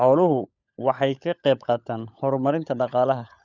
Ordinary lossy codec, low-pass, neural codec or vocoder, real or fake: none; 7.2 kHz; codec, 16 kHz, 16 kbps, FunCodec, trained on LibriTTS, 50 frames a second; fake